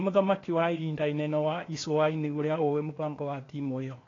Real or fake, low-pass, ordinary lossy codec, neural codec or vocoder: fake; 7.2 kHz; AAC, 32 kbps; codec, 16 kHz, 0.8 kbps, ZipCodec